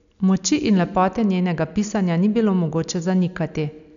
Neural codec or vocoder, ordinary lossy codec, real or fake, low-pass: none; none; real; 7.2 kHz